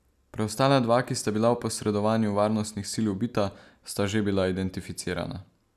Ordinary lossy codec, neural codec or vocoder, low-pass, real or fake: none; none; 14.4 kHz; real